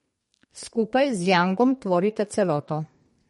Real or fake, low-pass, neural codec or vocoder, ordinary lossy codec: fake; 14.4 kHz; codec, 32 kHz, 1.9 kbps, SNAC; MP3, 48 kbps